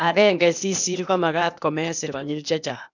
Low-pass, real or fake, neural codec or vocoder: 7.2 kHz; fake; codec, 16 kHz, 0.8 kbps, ZipCodec